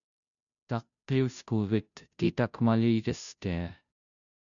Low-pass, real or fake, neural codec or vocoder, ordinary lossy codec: 7.2 kHz; fake; codec, 16 kHz, 0.5 kbps, FunCodec, trained on Chinese and English, 25 frames a second; MP3, 96 kbps